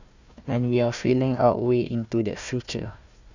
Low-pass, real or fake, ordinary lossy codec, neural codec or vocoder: 7.2 kHz; fake; none; codec, 16 kHz, 1 kbps, FunCodec, trained on Chinese and English, 50 frames a second